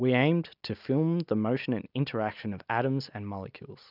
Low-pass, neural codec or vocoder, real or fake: 5.4 kHz; none; real